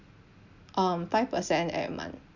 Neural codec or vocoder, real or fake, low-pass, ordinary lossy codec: none; real; 7.2 kHz; none